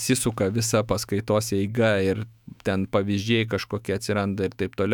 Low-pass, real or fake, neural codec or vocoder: 19.8 kHz; fake; autoencoder, 48 kHz, 128 numbers a frame, DAC-VAE, trained on Japanese speech